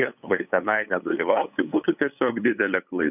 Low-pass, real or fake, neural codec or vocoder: 3.6 kHz; fake; codec, 16 kHz, 8 kbps, FunCodec, trained on LibriTTS, 25 frames a second